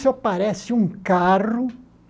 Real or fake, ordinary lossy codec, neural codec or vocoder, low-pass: real; none; none; none